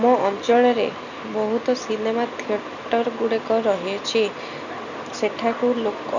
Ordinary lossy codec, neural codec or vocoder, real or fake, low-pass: none; none; real; 7.2 kHz